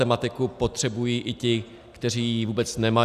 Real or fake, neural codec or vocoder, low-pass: real; none; 14.4 kHz